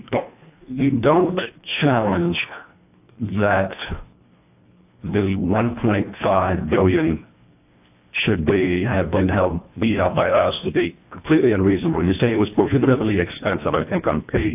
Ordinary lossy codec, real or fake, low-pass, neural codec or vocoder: AAC, 32 kbps; fake; 3.6 kHz; codec, 24 kHz, 1.5 kbps, HILCodec